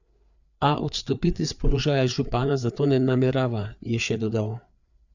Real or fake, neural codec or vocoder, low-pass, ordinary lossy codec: fake; codec, 16 kHz, 4 kbps, FreqCodec, larger model; 7.2 kHz; none